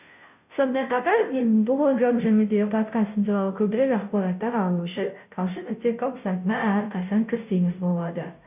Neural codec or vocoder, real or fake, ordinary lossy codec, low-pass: codec, 16 kHz, 0.5 kbps, FunCodec, trained on Chinese and English, 25 frames a second; fake; none; 3.6 kHz